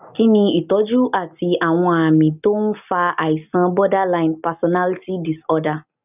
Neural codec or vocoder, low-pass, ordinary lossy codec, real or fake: none; 3.6 kHz; none; real